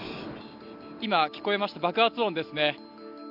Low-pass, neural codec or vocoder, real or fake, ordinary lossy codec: 5.4 kHz; none; real; none